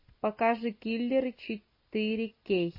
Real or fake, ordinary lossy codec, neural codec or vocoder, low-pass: real; MP3, 24 kbps; none; 5.4 kHz